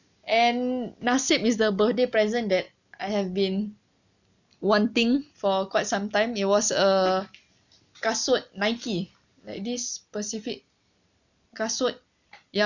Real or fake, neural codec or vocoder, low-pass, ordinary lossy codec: real; none; 7.2 kHz; none